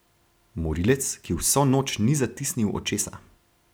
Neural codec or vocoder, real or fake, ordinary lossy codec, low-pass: none; real; none; none